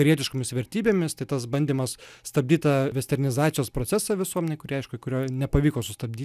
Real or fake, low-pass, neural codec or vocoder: real; 14.4 kHz; none